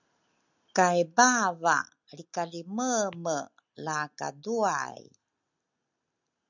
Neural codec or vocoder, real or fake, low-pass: none; real; 7.2 kHz